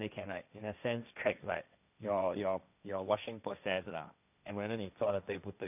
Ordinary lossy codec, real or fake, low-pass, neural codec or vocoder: none; fake; 3.6 kHz; codec, 16 kHz, 1.1 kbps, Voila-Tokenizer